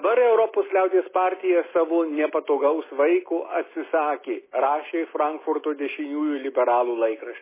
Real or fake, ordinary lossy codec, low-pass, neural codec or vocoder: real; MP3, 16 kbps; 3.6 kHz; none